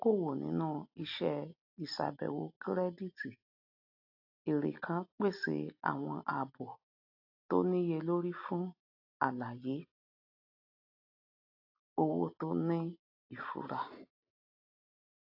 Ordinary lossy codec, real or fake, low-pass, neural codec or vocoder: AAC, 48 kbps; real; 5.4 kHz; none